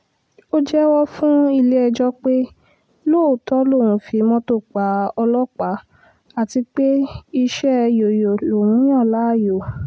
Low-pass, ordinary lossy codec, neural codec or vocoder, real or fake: none; none; none; real